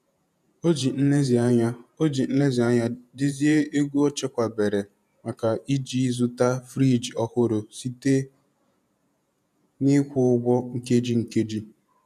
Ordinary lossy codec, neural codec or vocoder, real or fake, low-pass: none; vocoder, 48 kHz, 128 mel bands, Vocos; fake; 14.4 kHz